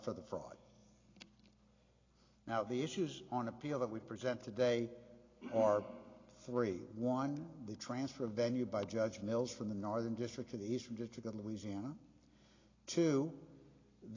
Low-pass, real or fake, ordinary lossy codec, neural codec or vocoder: 7.2 kHz; real; AAC, 32 kbps; none